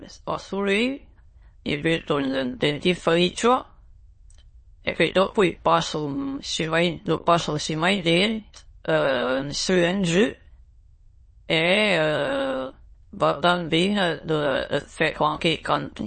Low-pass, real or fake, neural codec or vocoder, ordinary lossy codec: 9.9 kHz; fake; autoencoder, 22.05 kHz, a latent of 192 numbers a frame, VITS, trained on many speakers; MP3, 32 kbps